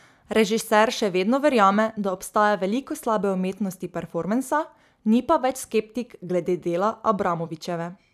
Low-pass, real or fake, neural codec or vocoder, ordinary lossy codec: 14.4 kHz; fake; vocoder, 44.1 kHz, 128 mel bands every 256 samples, BigVGAN v2; none